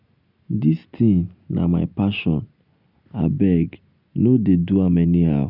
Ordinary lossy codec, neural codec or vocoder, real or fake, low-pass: none; none; real; 5.4 kHz